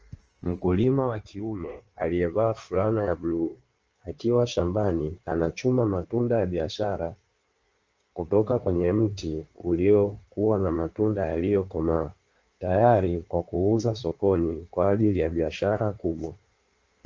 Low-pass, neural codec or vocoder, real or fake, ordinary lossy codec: 7.2 kHz; codec, 16 kHz in and 24 kHz out, 1.1 kbps, FireRedTTS-2 codec; fake; Opus, 24 kbps